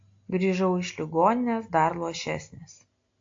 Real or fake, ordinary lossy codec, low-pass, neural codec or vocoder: real; AAC, 48 kbps; 7.2 kHz; none